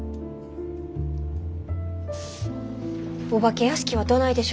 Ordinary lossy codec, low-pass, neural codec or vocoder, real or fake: none; none; none; real